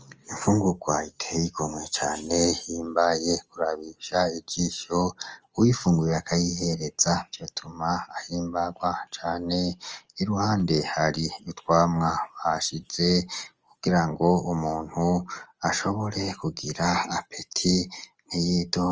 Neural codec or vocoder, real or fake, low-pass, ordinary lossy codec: none; real; 7.2 kHz; Opus, 24 kbps